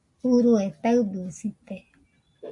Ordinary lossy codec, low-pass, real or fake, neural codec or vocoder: AAC, 64 kbps; 10.8 kHz; real; none